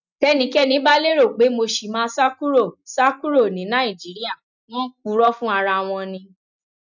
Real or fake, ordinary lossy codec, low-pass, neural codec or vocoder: real; none; 7.2 kHz; none